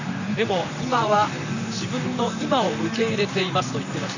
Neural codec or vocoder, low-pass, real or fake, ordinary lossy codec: vocoder, 44.1 kHz, 128 mel bands, Pupu-Vocoder; 7.2 kHz; fake; none